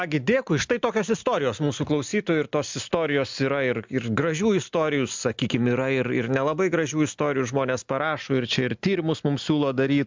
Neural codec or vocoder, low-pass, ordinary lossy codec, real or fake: none; 7.2 kHz; MP3, 64 kbps; real